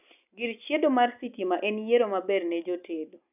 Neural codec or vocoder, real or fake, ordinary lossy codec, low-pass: none; real; none; 3.6 kHz